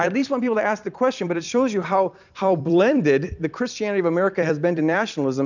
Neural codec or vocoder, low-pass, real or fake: vocoder, 44.1 kHz, 128 mel bands every 256 samples, BigVGAN v2; 7.2 kHz; fake